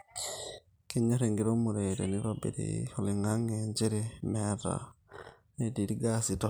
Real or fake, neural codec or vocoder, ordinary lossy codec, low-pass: real; none; none; none